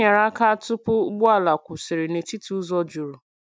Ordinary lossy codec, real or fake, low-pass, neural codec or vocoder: none; real; none; none